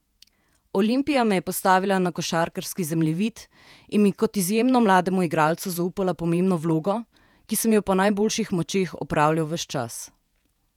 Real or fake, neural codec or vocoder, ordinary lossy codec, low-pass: fake; vocoder, 48 kHz, 128 mel bands, Vocos; none; 19.8 kHz